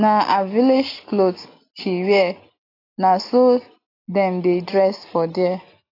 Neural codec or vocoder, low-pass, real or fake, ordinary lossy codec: none; 5.4 kHz; real; AAC, 24 kbps